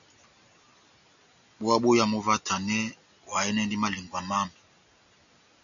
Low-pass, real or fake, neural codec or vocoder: 7.2 kHz; real; none